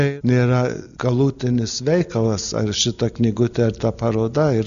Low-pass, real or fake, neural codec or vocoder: 7.2 kHz; real; none